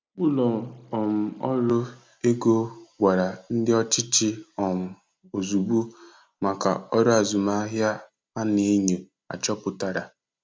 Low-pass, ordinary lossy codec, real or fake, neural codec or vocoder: none; none; real; none